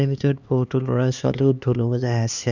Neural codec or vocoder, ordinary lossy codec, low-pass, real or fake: codec, 16 kHz, 2 kbps, X-Codec, HuBERT features, trained on LibriSpeech; none; 7.2 kHz; fake